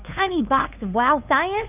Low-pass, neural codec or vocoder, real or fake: 3.6 kHz; codec, 16 kHz, 2 kbps, FunCodec, trained on LibriTTS, 25 frames a second; fake